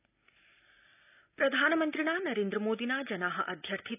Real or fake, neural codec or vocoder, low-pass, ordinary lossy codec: real; none; 3.6 kHz; none